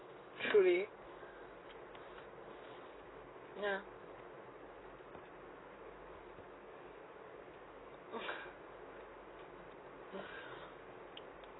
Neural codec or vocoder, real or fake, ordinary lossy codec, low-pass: none; real; AAC, 16 kbps; 7.2 kHz